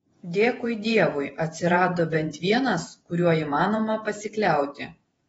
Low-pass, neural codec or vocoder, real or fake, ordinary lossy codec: 19.8 kHz; vocoder, 48 kHz, 128 mel bands, Vocos; fake; AAC, 24 kbps